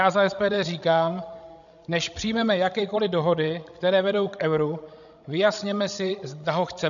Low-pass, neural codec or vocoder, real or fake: 7.2 kHz; codec, 16 kHz, 16 kbps, FreqCodec, larger model; fake